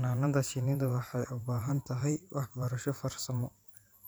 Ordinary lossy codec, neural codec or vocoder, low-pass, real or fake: none; vocoder, 44.1 kHz, 128 mel bands every 512 samples, BigVGAN v2; none; fake